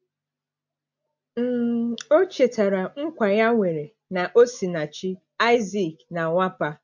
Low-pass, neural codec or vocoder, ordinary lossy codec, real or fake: 7.2 kHz; none; MP3, 48 kbps; real